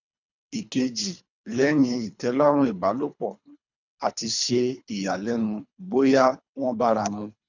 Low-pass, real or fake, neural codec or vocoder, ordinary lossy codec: 7.2 kHz; fake; codec, 24 kHz, 3 kbps, HILCodec; none